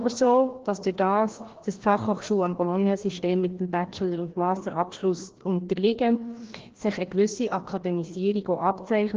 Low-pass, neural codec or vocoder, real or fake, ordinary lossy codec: 7.2 kHz; codec, 16 kHz, 1 kbps, FreqCodec, larger model; fake; Opus, 16 kbps